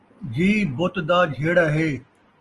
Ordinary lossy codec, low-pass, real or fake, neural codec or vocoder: Opus, 24 kbps; 10.8 kHz; real; none